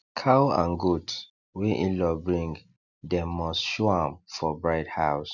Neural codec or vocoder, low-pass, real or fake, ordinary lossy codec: none; 7.2 kHz; real; none